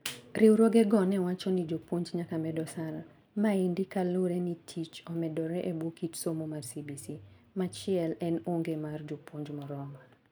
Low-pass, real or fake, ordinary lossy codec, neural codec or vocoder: none; real; none; none